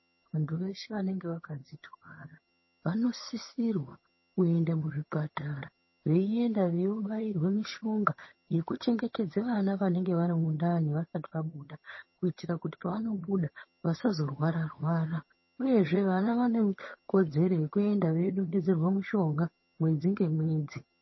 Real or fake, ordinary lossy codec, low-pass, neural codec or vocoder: fake; MP3, 24 kbps; 7.2 kHz; vocoder, 22.05 kHz, 80 mel bands, HiFi-GAN